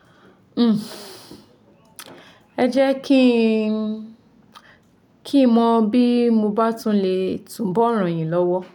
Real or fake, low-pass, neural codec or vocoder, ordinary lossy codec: real; 19.8 kHz; none; none